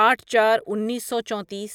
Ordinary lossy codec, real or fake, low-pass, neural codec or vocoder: none; real; 19.8 kHz; none